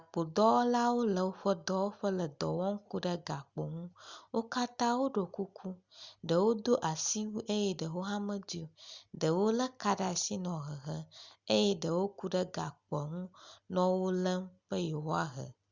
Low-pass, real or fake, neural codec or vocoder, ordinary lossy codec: 7.2 kHz; real; none; Opus, 64 kbps